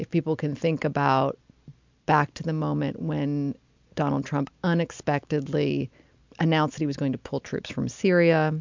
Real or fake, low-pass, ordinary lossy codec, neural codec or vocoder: real; 7.2 kHz; MP3, 64 kbps; none